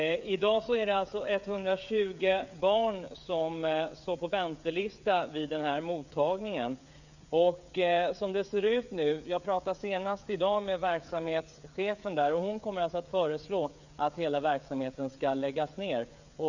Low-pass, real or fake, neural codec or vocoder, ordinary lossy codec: 7.2 kHz; fake; codec, 16 kHz, 8 kbps, FreqCodec, smaller model; none